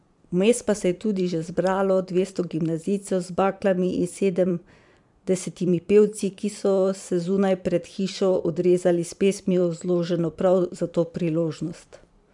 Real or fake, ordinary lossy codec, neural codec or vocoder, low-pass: fake; MP3, 96 kbps; vocoder, 44.1 kHz, 128 mel bands every 512 samples, BigVGAN v2; 10.8 kHz